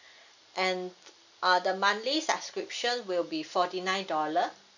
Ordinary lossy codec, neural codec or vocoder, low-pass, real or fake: none; none; 7.2 kHz; real